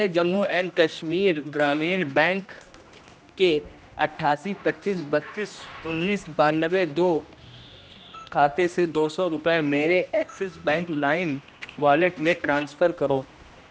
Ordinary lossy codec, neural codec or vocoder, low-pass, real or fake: none; codec, 16 kHz, 1 kbps, X-Codec, HuBERT features, trained on general audio; none; fake